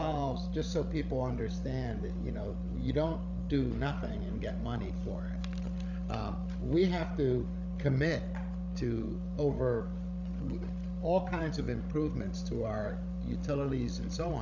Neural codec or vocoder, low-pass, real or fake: codec, 16 kHz, 16 kbps, FreqCodec, larger model; 7.2 kHz; fake